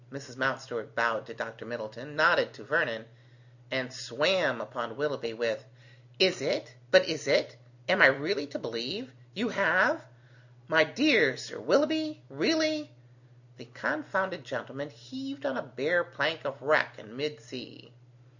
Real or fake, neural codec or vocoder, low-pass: real; none; 7.2 kHz